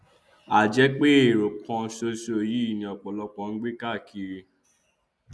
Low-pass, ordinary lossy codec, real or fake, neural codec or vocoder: none; none; real; none